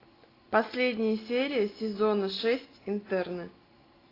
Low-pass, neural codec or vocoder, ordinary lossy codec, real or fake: 5.4 kHz; none; AAC, 24 kbps; real